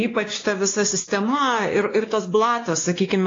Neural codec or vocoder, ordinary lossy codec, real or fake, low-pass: codec, 16 kHz, 2 kbps, X-Codec, WavLM features, trained on Multilingual LibriSpeech; AAC, 32 kbps; fake; 7.2 kHz